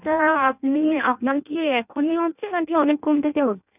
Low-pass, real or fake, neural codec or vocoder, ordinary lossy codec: 3.6 kHz; fake; codec, 16 kHz in and 24 kHz out, 0.6 kbps, FireRedTTS-2 codec; none